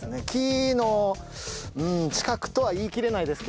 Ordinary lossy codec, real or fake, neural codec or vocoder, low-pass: none; real; none; none